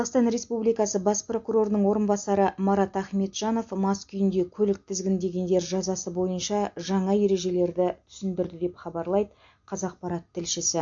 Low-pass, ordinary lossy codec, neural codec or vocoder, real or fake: 7.2 kHz; MP3, 48 kbps; none; real